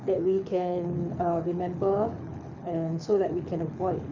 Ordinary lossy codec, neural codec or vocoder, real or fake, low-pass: none; codec, 24 kHz, 6 kbps, HILCodec; fake; 7.2 kHz